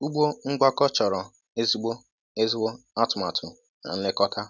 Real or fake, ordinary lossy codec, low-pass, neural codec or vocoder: real; none; none; none